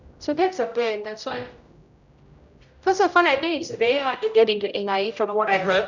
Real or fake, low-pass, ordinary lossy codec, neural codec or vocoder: fake; 7.2 kHz; none; codec, 16 kHz, 0.5 kbps, X-Codec, HuBERT features, trained on general audio